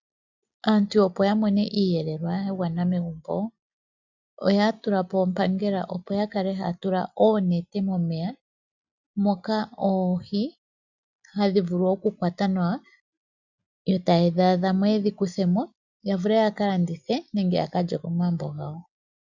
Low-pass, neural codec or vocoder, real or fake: 7.2 kHz; none; real